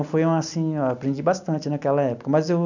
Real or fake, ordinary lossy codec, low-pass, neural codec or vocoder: real; none; 7.2 kHz; none